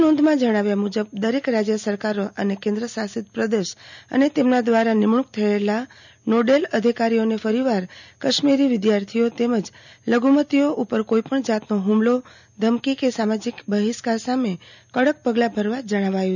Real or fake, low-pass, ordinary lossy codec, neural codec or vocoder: real; 7.2 kHz; none; none